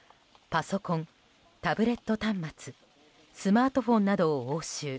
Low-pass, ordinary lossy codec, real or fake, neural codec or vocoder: none; none; real; none